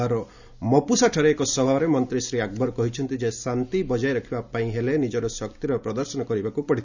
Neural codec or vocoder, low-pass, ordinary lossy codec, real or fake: none; 7.2 kHz; none; real